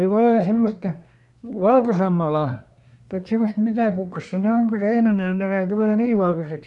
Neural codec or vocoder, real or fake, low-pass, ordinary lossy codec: codec, 24 kHz, 1 kbps, SNAC; fake; 10.8 kHz; none